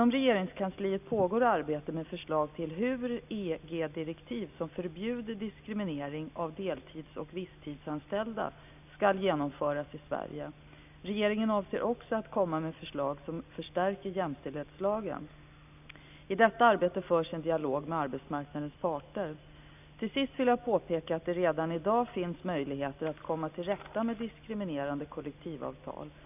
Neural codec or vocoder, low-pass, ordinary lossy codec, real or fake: none; 3.6 kHz; none; real